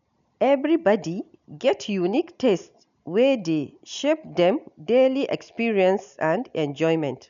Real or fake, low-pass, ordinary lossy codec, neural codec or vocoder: real; 7.2 kHz; none; none